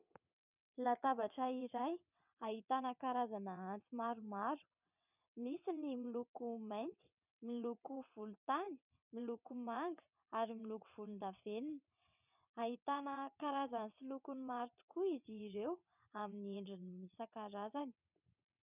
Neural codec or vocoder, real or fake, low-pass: vocoder, 22.05 kHz, 80 mel bands, WaveNeXt; fake; 3.6 kHz